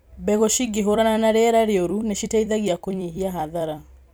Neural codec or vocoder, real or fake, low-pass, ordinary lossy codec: vocoder, 44.1 kHz, 128 mel bands every 256 samples, BigVGAN v2; fake; none; none